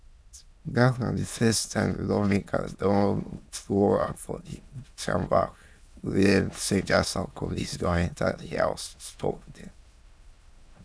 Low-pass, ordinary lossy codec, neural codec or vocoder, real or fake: none; none; autoencoder, 22.05 kHz, a latent of 192 numbers a frame, VITS, trained on many speakers; fake